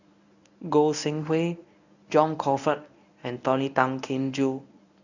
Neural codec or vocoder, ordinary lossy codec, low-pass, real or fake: codec, 24 kHz, 0.9 kbps, WavTokenizer, medium speech release version 1; none; 7.2 kHz; fake